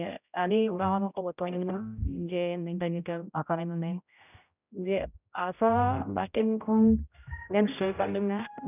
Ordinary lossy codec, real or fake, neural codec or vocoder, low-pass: none; fake; codec, 16 kHz, 0.5 kbps, X-Codec, HuBERT features, trained on general audio; 3.6 kHz